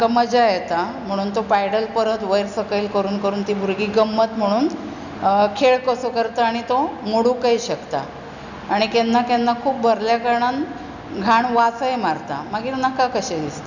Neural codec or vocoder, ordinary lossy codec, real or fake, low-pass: none; none; real; 7.2 kHz